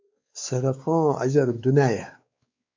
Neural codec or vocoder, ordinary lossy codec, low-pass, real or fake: codec, 16 kHz, 4 kbps, X-Codec, WavLM features, trained on Multilingual LibriSpeech; MP3, 64 kbps; 7.2 kHz; fake